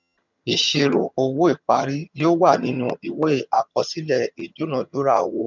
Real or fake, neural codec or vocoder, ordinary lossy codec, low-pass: fake; vocoder, 22.05 kHz, 80 mel bands, HiFi-GAN; none; 7.2 kHz